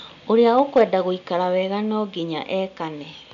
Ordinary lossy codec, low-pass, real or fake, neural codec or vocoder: none; 7.2 kHz; real; none